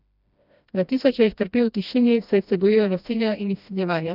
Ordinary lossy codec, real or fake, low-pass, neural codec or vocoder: none; fake; 5.4 kHz; codec, 16 kHz, 1 kbps, FreqCodec, smaller model